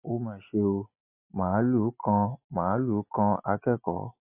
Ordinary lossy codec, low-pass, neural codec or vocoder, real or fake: MP3, 24 kbps; 3.6 kHz; none; real